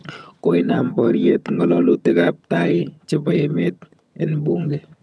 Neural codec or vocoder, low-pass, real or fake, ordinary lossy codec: vocoder, 22.05 kHz, 80 mel bands, HiFi-GAN; none; fake; none